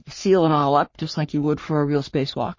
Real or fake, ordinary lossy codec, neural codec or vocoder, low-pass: fake; MP3, 32 kbps; codec, 44.1 kHz, 3.4 kbps, Pupu-Codec; 7.2 kHz